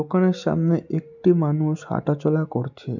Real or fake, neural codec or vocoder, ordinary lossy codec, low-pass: real; none; none; 7.2 kHz